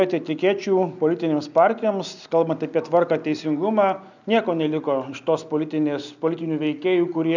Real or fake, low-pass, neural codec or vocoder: real; 7.2 kHz; none